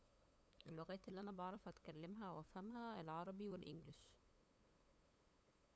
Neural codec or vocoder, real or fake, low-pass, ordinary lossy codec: codec, 16 kHz, 8 kbps, FunCodec, trained on LibriTTS, 25 frames a second; fake; none; none